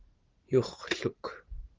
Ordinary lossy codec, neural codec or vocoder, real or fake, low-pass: Opus, 16 kbps; vocoder, 44.1 kHz, 80 mel bands, Vocos; fake; 7.2 kHz